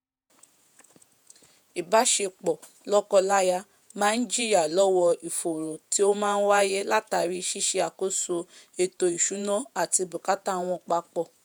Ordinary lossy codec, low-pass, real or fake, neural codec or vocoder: none; none; fake; vocoder, 48 kHz, 128 mel bands, Vocos